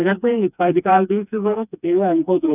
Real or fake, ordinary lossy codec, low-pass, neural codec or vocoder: fake; none; 3.6 kHz; codec, 16 kHz, 2 kbps, FreqCodec, smaller model